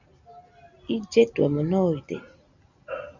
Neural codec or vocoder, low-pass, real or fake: none; 7.2 kHz; real